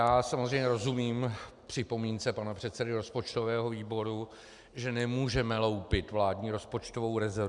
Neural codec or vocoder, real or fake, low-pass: none; real; 10.8 kHz